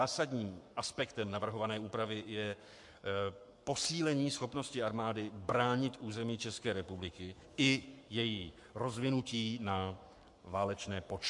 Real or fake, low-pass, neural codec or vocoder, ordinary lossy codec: fake; 10.8 kHz; codec, 44.1 kHz, 7.8 kbps, Pupu-Codec; MP3, 64 kbps